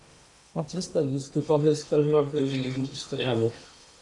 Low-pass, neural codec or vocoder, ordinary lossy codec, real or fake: 10.8 kHz; codec, 16 kHz in and 24 kHz out, 0.8 kbps, FocalCodec, streaming, 65536 codes; MP3, 64 kbps; fake